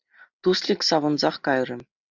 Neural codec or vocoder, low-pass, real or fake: none; 7.2 kHz; real